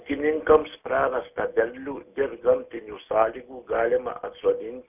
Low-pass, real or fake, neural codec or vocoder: 3.6 kHz; real; none